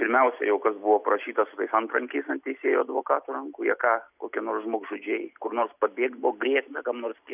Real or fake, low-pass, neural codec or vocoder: real; 3.6 kHz; none